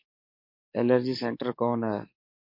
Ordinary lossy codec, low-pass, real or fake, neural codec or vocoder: MP3, 32 kbps; 5.4 kHz; fake; codec, 44.1 kHz, 7.8 kbps, DAC